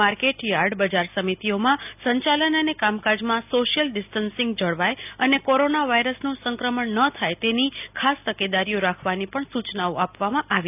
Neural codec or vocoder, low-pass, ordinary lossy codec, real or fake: none; 3.6 kHz; none; real